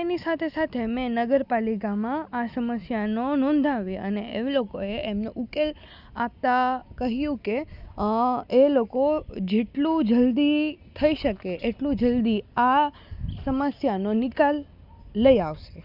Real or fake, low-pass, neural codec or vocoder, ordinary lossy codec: real; 5.4 kHz; none; none